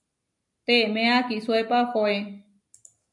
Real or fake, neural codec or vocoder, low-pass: real; none; 10.8 kHz